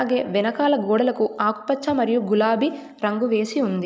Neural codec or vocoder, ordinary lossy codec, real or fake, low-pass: none; none; real; none